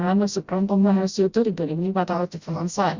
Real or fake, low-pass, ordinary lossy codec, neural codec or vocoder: fake; 7.2 kHz; Opus, 64 kbps; codec, 16 kHz, 0.5 kbps, FreqCodec, smaller model